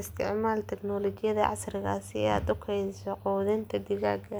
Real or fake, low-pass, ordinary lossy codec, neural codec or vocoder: real; none; none; none